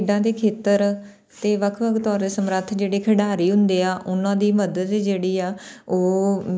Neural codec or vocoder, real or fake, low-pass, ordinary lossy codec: none; real; none; none